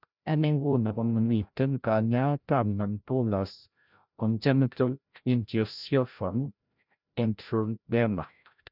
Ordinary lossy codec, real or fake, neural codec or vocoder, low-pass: none; fake; codec, 16 kHz, 0.5 kbps, FreqCodec, larger model; 5.4 kHz